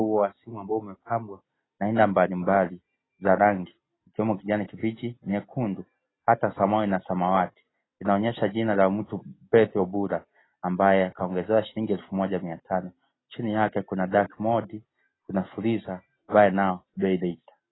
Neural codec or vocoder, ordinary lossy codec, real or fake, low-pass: none; AAC, 16 kbps; real; 7.2 kHz